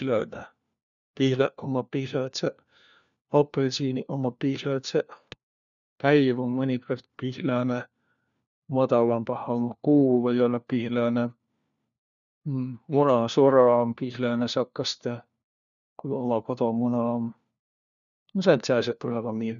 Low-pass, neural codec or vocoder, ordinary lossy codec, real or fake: 7.2 kHz; codec, 16 kHz, 1 kbps, FunCodec, trained on LibriTTS, 50 frames a second; none; fake